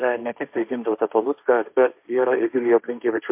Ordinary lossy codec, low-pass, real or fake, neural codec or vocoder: MP3, 32 kbps; 3.6 kHz; fake; codec, 16 kHz, 1.1 kbps, Voila-Tokenizer